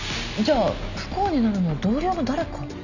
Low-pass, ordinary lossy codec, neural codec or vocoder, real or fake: 7.2 kHz; none; none; real